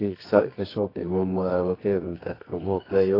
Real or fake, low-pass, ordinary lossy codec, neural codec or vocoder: fake; 5.4 kHz; AAC, 24 kbps; codec, 24 kHz, 0.9 kbps, WavTokenizer, medium music audio release